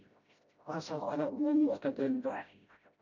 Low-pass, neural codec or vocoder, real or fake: 7.2 kHz; codec, 16 kHz, 0.5 kbps, FreqCodec, smaller model; fake